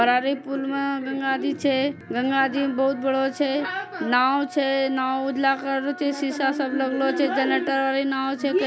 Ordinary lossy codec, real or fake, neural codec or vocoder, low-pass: none; real; none; none